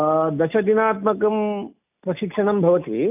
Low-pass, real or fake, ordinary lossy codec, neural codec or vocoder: 3.6 kHz; real; none; none